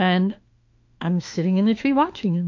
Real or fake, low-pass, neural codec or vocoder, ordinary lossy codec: fake; 7.2 kHz; autoencoder, 48 kHz, 32 numbers a frame, DAC-VAE, trained on Japanese speech; MP3, 48 kbps